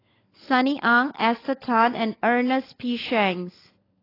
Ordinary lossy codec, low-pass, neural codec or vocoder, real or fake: AAC, 24 kbps; 5.4 kHz; codec, 16 kHz, 16 kbps, FunCodec, trained on LibriTTS, 50 frames a second; fake